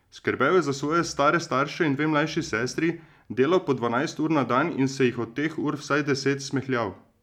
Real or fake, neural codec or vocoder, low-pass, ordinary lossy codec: real; none; 19.8 kHz; none